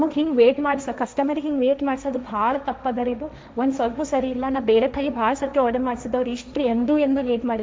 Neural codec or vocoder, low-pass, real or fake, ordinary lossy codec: codec, 16 kHz, 1.1 kbps, Voila-Tokenizer; none; fake; none